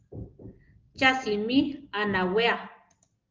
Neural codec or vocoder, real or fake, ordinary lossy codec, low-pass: none; real; Opus, 32 kbps; 7.2 kHz